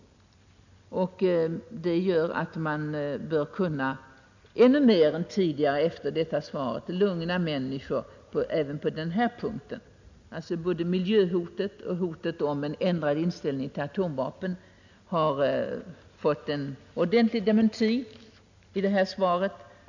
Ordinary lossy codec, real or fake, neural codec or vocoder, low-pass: none; real; none; 7.2 kHz